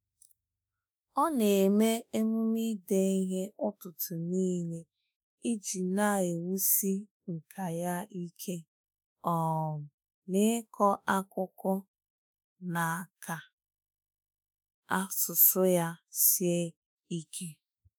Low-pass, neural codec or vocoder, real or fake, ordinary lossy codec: none; autoencoder, 48 kHz, 32 numbers a frame, DAC-VAE, trained on Japanese speech; fake; none